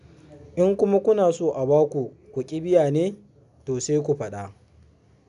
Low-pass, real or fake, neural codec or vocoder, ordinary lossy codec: 10.8 kHz; real; none; none